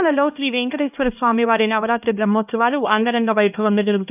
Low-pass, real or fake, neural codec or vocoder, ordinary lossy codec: 3.6 kHz; fake; codec, 16 kHz, 1 kbps, X-Codec, HuBERT features, trained on LibriSpeech; none